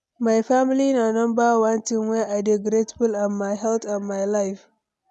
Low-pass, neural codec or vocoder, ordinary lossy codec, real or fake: none; none; none; real